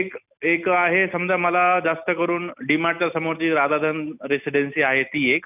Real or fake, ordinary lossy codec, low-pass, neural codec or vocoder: real; none; 3.6 kHz; none